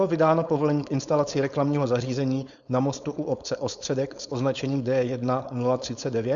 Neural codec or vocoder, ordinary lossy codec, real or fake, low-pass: codec, 16 kHz, 4.8 kbps, FACodec; Opus, 64 kbps; fake; 7.2 kHz